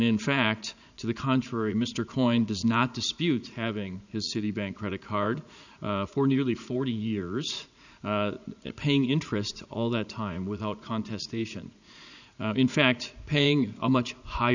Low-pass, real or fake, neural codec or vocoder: 7.2 kHz; fake; vocoder, 44.1 kHz, 80 mel bands, Vocos